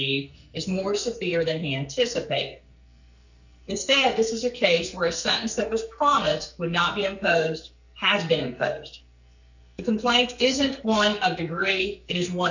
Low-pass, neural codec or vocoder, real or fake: 7.2 kHz; codec, 44.1 kHz, 2.6 kbps, SNAC; fake